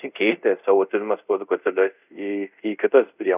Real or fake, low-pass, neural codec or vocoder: fake; 3.6 kHz; codec, 24 kHz, 0.5 kbps, DualCodec